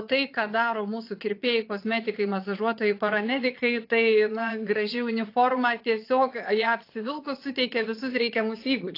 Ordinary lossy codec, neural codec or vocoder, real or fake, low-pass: AAC, 32 kbps; vocoder, 22.05 kHz, 80 mel bands, Vocos; fake; 5.4 kHz